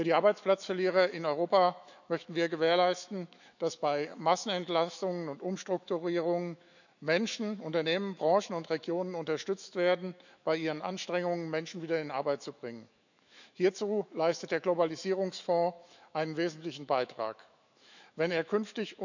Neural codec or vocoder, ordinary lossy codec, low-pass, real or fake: autoencoder, 48 kHz, 128 numbers a frame, DAC-VAE, trained on Japanese speech; none; 7.2 kHz; fake